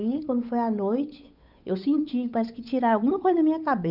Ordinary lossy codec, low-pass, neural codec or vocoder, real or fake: none; 5.4 kHz; codec, 16 kHz, 8 kbps, FunCodec, trained on Chinese and English, 25 frames a second; fake